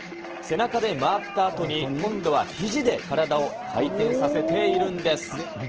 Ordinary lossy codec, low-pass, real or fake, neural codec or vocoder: Opus, 16 kbps; 7.2 kHz; real; none